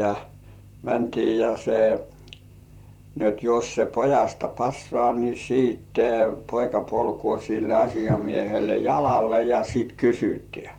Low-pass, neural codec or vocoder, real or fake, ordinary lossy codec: 19.8 kHz; vocoder, 44.1 kHz, 128 mel bands, Pupu-Vocoder; fake; none